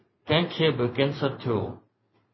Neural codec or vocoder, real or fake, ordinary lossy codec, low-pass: vocoder, 44.1 kHz, 128 mel bands every 512 samples, BigVGAN v2; fake; MP3, 24 kbps; 7.2 kHz